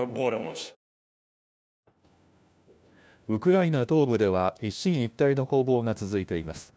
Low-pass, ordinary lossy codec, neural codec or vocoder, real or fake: none; none; codec, 16 kHz, 1 kbps, FunCodec, trained on LibriTTS, 50 frames a second; fake